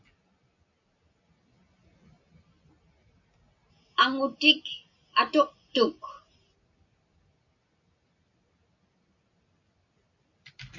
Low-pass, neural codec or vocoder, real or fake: 7.2 kHz; none; real